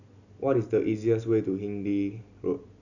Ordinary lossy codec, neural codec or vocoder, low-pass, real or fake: none; none; 7.2 kHz; real